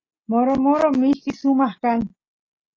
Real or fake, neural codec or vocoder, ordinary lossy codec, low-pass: real; none; AAC, 32 kbps; 7.2 kHz